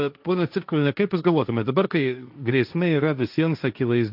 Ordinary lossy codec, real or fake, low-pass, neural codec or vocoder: AAC, 48 kbps; fake; 5.4 kHz; codec, 16 kHz, 1.1 kbps, Voila-Tokenizer